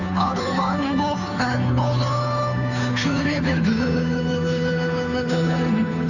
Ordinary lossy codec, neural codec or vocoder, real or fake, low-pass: none; codec, 16 kHz, 2 kbps, FunCodec, trained on Chinese and English, 25 frames a second; fake; 7.2 kHz